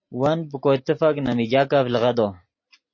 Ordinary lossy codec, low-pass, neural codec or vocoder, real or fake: MP3, 32 kbps; 7.2 kHz; none; real